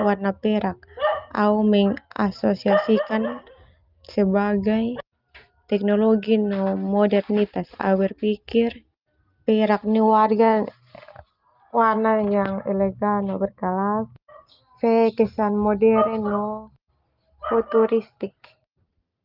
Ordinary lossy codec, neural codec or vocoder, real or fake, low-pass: Opus, 32 kbps; none; real; 5.4 kHz